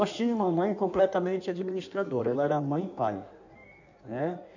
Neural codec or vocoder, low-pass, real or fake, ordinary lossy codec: codec, 16 kHz in and 24 kHz out, 1.1 kbps, FireRedTTS-2 codec; 7.2 kHz; fake; none